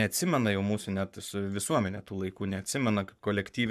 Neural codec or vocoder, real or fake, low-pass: codec, 44.1 kHz, 7.8 kbps, Pupu-Codec; fake; 14.4 kHz